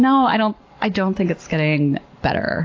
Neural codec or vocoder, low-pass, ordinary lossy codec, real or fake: none; 7.2 kHz; AAC, 32 kbps; real